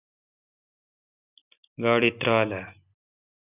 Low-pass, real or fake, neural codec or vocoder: 3.6 kHz; real; none